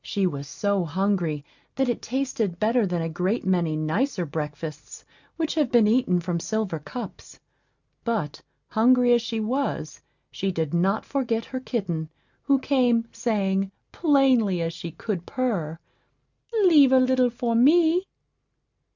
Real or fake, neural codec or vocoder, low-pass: real; none; 7.2 kHz